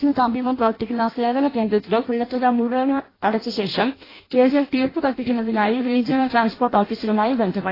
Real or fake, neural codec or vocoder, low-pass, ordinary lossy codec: fake; codec, 16 kHz in and 24 kHz out, 0.6 kbps, FireRedTTS-2 codec; 5.4 kHz; AAC, 24 kbps